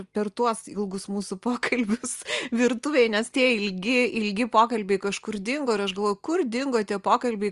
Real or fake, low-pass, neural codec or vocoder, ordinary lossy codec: real; 10.8 kHz; none; Opus, 32 kbps